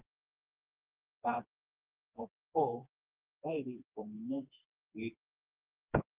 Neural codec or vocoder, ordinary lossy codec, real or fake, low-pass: codec, 16 kHz, 2 kbps, FreqCodec, smaller model; Opus, 24 kbps; fake; 3.6 kHz